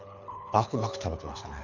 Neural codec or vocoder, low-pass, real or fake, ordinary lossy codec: codec, 24 kHz, 6 kbps, HILCodec; 7.2 kHz; fake; none